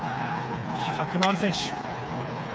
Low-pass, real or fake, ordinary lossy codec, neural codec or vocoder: none; fake; none; codec, 16 kHz, 4 kbps, FreqCodec, smaller model